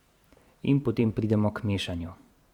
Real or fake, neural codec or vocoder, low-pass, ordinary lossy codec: fake; vocoder, 44.1 kHz, 128 mel bands every 512 samples, BigVGAN v2; 19.8 kHz; Opus, 64 kbps